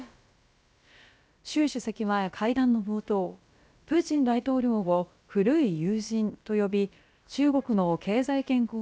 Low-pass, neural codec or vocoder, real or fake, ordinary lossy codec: none; codec, 16 kHz, about 1 kbps, DyCAST, with the encoder's durations; fake; none